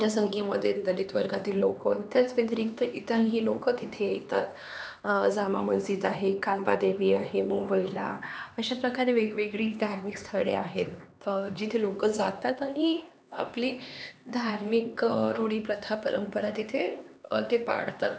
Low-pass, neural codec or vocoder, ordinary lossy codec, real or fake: none; codec, 16 kHz, 2 kbps, X-Codec, HuBERT features, trained on LibriSpeech; none; fake